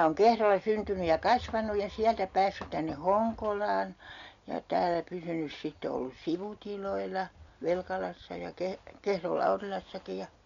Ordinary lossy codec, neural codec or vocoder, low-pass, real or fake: none; none; 7.2 kHz; real